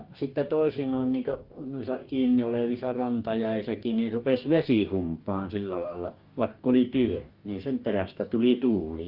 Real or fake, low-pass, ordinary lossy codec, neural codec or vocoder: fake; 5.4 kHz; Opus, 32 kbps; codec, 44.1 kHz, 2.6 kbps, DAC